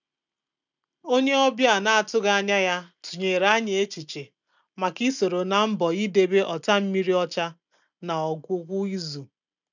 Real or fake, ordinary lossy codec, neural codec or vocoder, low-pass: real; none; none; 7.2 kHz